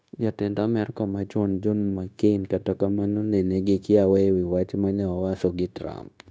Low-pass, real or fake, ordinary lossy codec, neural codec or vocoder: none; fake; none; codec, 16 kHz, 0.9 kbps, LongCat-Audio-Codec